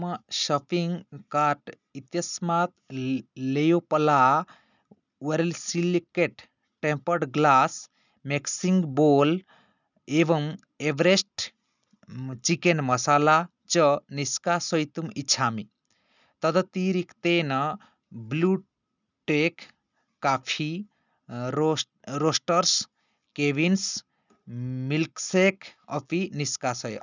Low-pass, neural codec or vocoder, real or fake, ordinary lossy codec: 7.2 kHz; none; real; none